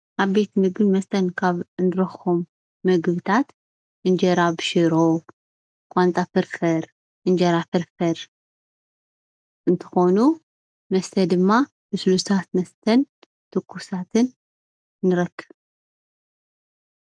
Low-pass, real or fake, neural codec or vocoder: 9.9 kHz; real; none